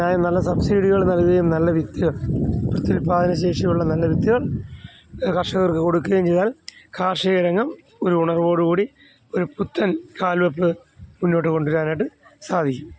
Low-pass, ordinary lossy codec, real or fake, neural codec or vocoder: none; none; real; none